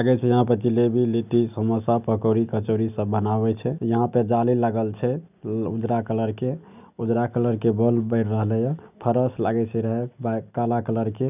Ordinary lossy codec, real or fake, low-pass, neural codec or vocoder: none; real; 3.6 kHz; none